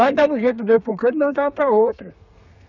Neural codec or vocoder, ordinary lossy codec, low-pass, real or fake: codec, 32 kHz, 1.9 kbps, SNAC; none; 7.2 kHz; fake